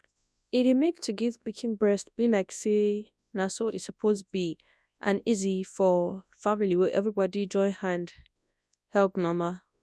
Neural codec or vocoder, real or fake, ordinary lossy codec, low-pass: codec, 24 kHz, 0.9 kbps, WavTokenizer, large speech release; fake; none; none